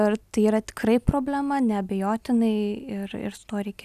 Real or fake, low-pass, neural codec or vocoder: real; 14.4 kHz; none